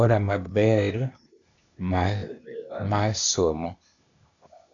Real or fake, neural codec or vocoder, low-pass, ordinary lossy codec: fake; codec, 16 kHz, 0.8 kbps, ZipCodec; 7.2 kHz; MP3, 96 kbps